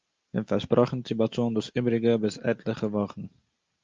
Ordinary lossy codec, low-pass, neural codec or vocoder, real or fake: Opus, 24 kbps; 7.2 kHz; none; real